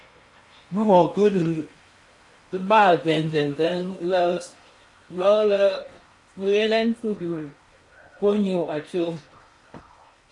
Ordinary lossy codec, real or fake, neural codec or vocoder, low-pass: MP3, 48 kbps; fake; codec, 16 kHz in and 24 kHz out, 0.8 kbps, FocalCodec, streaming, 65536 codes; 10.8 kHz